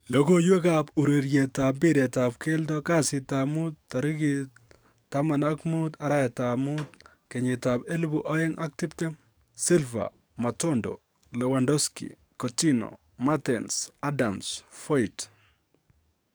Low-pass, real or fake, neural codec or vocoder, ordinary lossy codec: none; fake; codec, 44.1 kHz, 7.8 kbps, DAC; none